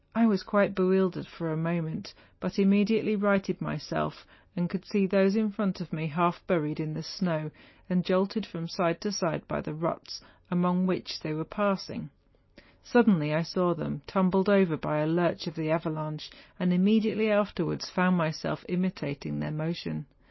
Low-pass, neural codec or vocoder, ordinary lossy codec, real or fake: 7.2 kHz; none; MP3, 24 kbps; real